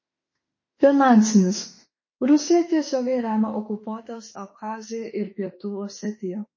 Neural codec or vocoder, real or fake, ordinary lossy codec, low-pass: autoencoder, 48 kHz, 32 numbers a frame, DAC-VAE, trained on Japanese speech; fake; MP3, 32 kbps; 7.2 kHz